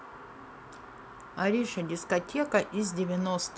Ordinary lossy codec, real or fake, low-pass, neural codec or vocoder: none; real; none; none